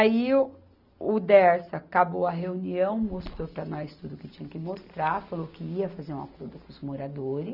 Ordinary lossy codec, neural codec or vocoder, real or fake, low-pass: none; none; real; 5.4 kHz